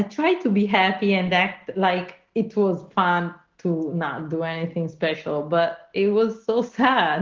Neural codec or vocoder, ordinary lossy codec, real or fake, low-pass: none; Opus, 16 kbps; real; 7.2 kHz